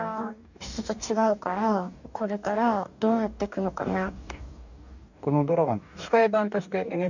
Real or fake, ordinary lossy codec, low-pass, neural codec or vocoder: fake; none; 7.2 kHz; codec, 44.1 kHz, 2.6 kbps, DAC